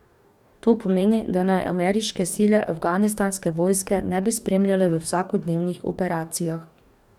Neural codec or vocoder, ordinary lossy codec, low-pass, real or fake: codec, 44.1 kHz, 2.6 kbps, DAC; none; 19.8 kHz; fake